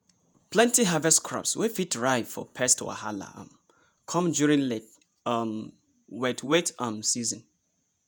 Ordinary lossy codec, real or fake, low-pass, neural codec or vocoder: none; fake; none; vocoder, 48 kHz, 128 mel bands, Vocos